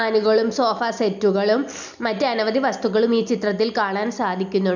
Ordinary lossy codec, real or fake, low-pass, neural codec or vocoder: none; real; 7.2 kHz; none